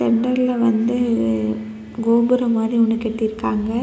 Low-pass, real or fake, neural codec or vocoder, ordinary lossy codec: none; real; none; none